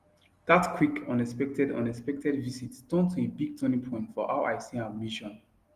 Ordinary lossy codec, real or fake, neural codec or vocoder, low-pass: Opus, 24 kbps; real; none; 14.4 kHz